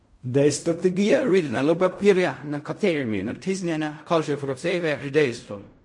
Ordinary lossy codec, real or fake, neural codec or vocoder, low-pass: MP3, 48 kbps; fake; codec, 16 kHz in and 24 kHz out, 0.4 kbps, LongCat-Audio-Codec, fine tuned four codebook decoder; 10.8 kHz